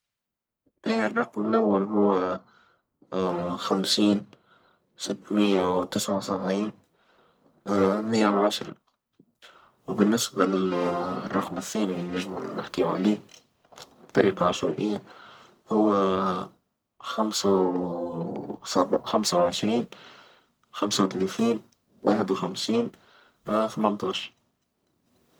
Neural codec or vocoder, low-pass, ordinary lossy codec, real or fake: codec, 44.1 kHz, 1.7 kbps, Pupu-Codec; none; none; fake